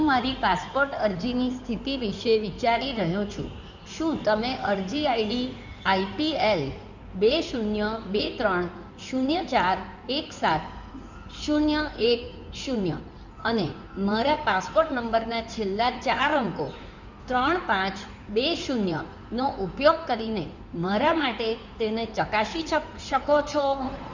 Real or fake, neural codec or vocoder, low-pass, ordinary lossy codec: fake; codec, 16 kHz in and 24 kHz out, 2.2 kbps, FireRedTTS-2 codec; 7.2 kHz; none